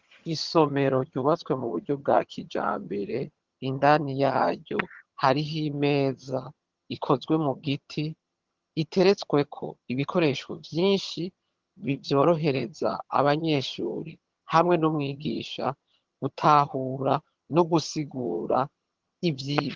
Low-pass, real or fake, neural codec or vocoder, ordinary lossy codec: 7.2 kHz; fake; vocoder, 22.05 kHz, 80 mel bands, HiFi-GAN; Opus, 16 kbps